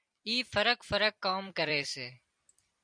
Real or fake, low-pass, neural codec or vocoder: fake; 9.9 kHz; vocoder, 44.1 kHz, 128 mel bands every 256 samples, BigVGAN v2